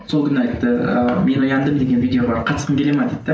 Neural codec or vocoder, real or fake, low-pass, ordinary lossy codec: none; real; none; none